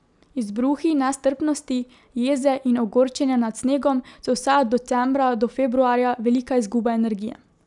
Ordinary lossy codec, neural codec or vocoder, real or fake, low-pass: none; none; real; 10.8 kHz